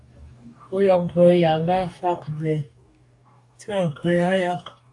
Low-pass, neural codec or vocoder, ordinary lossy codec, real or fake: 10.8 kHz; codec, 44.1 kHz, 2.6 kbps, DAC; AAC, 64 kbps; fake